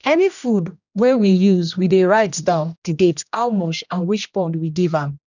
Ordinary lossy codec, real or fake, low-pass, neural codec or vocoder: none; fake; 7.2 kHz; codec, 16 kHz, 1 kbps, X-Codec, HuBERT features, trained on general audio